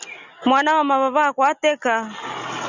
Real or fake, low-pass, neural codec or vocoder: real; 7.2 kHz; none